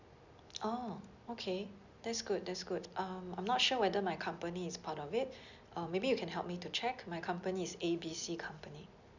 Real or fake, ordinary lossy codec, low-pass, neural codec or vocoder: real; none; 7.2 kHz; none